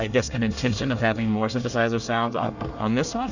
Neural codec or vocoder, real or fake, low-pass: codec, 24 kHz, 1 kbps, SNAC; fake; 7.2 kHz